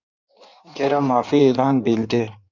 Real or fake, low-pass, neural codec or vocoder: fake; 7.2 kHz; codec, 16 kHz in and 24 kHz out, 1.1 kbps, FireRedTTS-2 codec